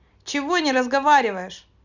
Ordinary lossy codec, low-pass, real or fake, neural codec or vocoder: none; 7.2 kHz; real; none